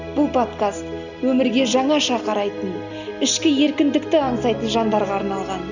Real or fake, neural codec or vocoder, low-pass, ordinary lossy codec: real; none; 7.2 kHz; none